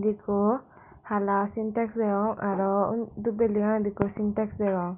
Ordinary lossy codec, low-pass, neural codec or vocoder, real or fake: Opus, 64 kbps; 3.6 kHz; none; real